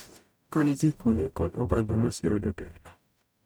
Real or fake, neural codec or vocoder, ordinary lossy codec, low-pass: fake; codec, 44.1 kHz, 0.9 kbps, DAC; none; none